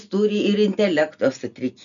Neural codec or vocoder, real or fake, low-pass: none; real; 7.2 kHz